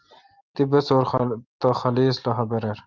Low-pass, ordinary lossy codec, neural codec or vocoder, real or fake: 7.2 kHz; Opus, 24 kbps; none; real